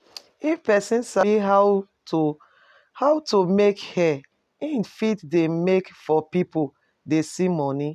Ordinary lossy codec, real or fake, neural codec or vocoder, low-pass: none; real; none; 14.4 kHz